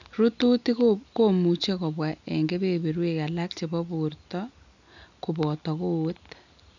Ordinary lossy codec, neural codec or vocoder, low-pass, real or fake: none; none; 7.2 kHz; real